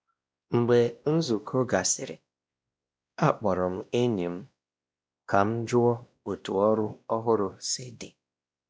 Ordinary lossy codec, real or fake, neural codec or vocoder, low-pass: none; fake; codec, 16 kHz, 1 kbps, X-Codec, WavLM features, trained on Multilingual LibriSpeech; none